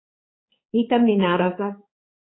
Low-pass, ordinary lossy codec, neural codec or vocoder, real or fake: 7.2 kHz; AAC, 16 kbps; codec, 16 kHz, 4 kbps, X-Codec, HuBERT features, trained on balanced general audio; fake